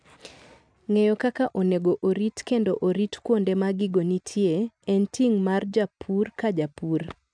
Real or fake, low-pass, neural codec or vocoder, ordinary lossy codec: real; 9.9 kHz; none; none